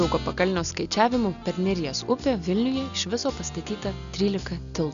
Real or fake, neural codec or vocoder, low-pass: real; none; 7.2 kHz